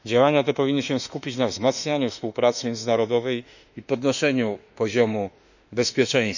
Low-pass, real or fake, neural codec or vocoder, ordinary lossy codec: 7.2 kHz; fake; autoencoder, 48 kHz, 32 numbers a frame, DAC-VAE, trained on Japanese speech; none